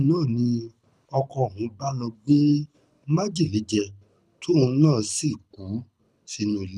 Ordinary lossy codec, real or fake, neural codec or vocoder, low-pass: none; fake; codec, 24 kHz, 6 kbps, HILCodec; none